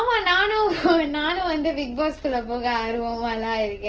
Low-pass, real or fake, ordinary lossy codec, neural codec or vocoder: 7.2 kHz; real; Opus, 16 kbps; none